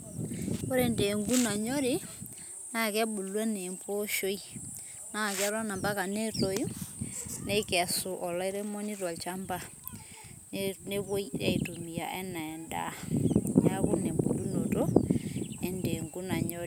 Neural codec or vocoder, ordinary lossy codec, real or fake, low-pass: none; none; real; none